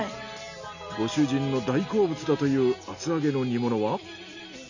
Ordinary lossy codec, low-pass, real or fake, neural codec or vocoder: none; 7.2 kHz; real; none